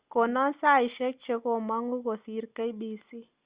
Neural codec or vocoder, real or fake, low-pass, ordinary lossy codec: none; real; 3.6 kHz; Opus, 64 kbps